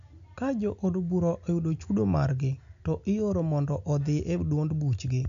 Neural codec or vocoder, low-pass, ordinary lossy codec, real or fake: none; 7.2 kHz; none; real